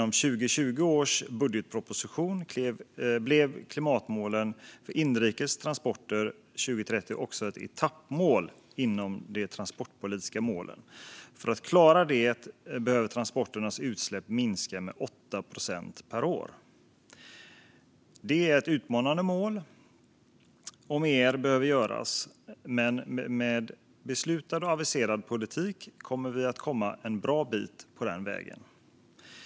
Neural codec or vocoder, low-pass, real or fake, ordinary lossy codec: none; none; real; none